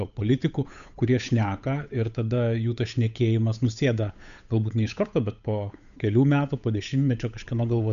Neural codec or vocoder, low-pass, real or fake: codec, 16 kHz, 8 kbps, FunCodec, trained on Chinese and English, 25 frames a second; 7.2 kHz; fake